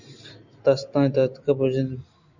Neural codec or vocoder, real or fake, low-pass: none; real; 7.2 kHz